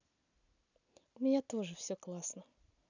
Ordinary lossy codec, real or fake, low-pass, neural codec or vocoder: none; real; 7.2 kHz; none